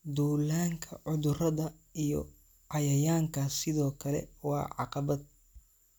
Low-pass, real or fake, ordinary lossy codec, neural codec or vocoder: none; real; none; none